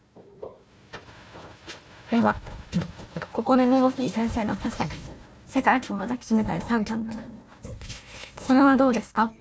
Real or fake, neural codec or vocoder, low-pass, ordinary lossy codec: fake; codec, 16 kHz, 1 kbps, FunCodec, trained on Chinese and English, 50 frames a second; none; none